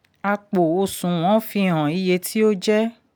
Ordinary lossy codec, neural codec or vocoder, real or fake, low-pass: none; none; real; none